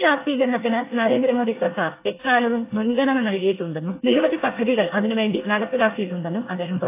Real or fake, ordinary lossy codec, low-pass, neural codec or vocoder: fake; AAC, 24 kbps; 3.6 kHz; codec, 24 kHz, 1 kbps, SNAC